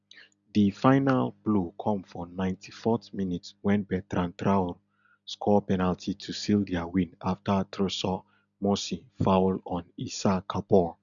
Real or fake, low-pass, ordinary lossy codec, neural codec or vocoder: real; 7.2 kHz; none; none